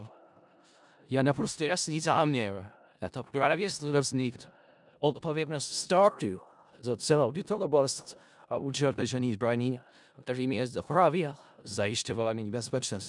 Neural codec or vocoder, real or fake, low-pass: codec, 16 kHz in and 24 kHz out, 0.4 kbps, LongCat-Audio-Codec, four codebook decoder; fake; 10.8 kHz